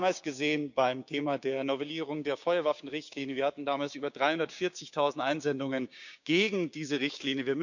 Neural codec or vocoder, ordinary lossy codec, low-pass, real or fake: codec, 16 kHz, 6 kbps, DAC; none; 7.2 kHz; fake